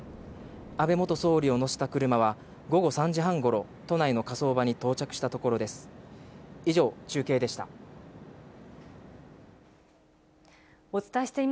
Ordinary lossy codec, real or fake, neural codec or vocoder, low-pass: none; real; none; none